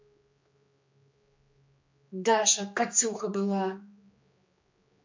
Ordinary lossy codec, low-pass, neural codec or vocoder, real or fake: MP3, 48 kbps; 7.2 kHz; codec, 16 kHz, 2 kbps, X-Codec, HuBERT features, trained on general audio; fake